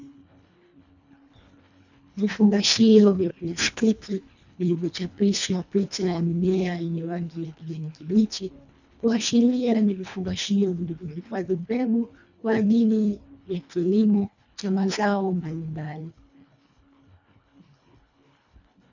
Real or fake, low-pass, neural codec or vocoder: fake; 7.2 kHz; codec, 24 kHz, 1.5 kbps, HILCodec